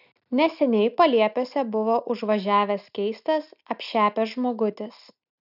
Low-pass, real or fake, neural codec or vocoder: 5.4 kHz; real; none